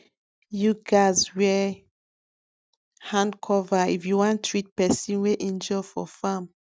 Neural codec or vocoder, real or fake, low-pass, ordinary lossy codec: none; real; none; none